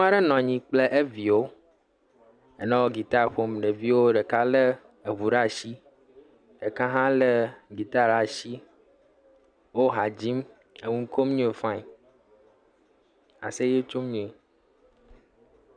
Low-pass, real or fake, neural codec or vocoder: 9.9 kHz; real; none